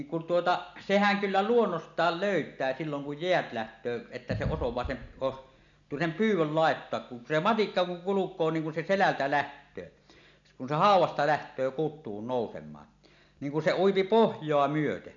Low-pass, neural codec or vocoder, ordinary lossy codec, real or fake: 7.2 kHz; none; none; real